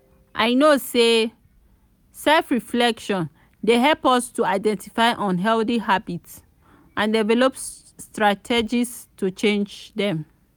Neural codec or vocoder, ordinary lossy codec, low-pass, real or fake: none; none; none; real